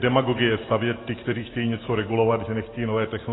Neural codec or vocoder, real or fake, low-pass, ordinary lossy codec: none; real; 7.2 kHz; AAC, 16 kbps